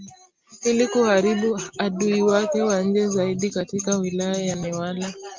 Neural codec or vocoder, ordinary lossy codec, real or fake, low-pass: none; Opus, 32 kbps; real; 7.2 kHz